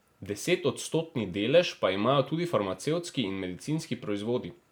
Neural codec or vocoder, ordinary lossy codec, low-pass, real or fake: none; none; none; real